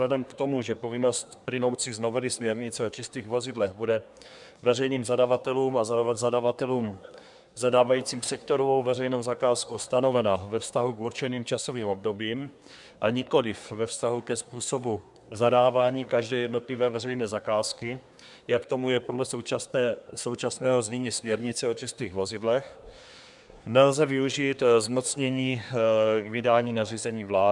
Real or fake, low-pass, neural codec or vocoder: fake; 10.8 kHz; codec, 24 kHz, 1 kbps, SNAC